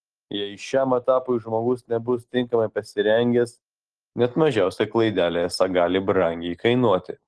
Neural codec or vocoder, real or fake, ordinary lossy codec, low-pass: none; real; Opus, 16 kbps; 10.8 kHz